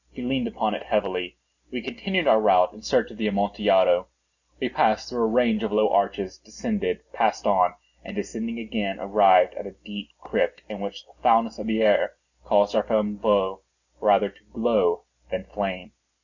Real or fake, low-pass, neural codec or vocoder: real; 7.2 kHz; none